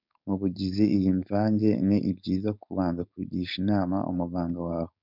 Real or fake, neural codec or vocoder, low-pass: fake; codec, 16 kHz, 4.8 kbps, FACodec; 5.4 kHz